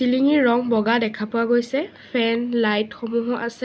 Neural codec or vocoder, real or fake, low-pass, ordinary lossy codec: none; real; none; none